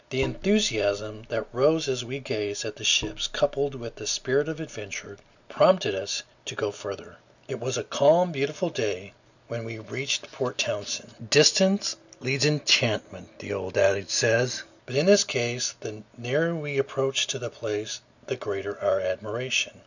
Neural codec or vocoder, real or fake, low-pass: none; real; 7.2 kHz